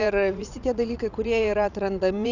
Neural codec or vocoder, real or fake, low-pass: vocoder, 44.1 kHz, 80 mel bands, Vocos; fake; 7.2 kHz